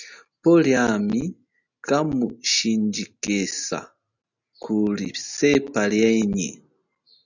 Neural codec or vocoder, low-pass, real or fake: none; 7.2 kHz; real